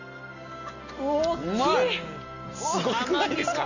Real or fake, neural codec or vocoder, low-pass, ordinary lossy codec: real; none; 7.2 kHz; none